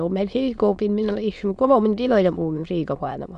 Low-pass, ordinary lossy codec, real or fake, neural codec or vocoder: 9.9 kHz; none; fake; autoencoder, 22.05 kHz, a latent of 192 numbers a frame, VITS, trained on many speakers